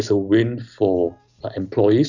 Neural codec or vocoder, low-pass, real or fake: none; 7.2 kHz; real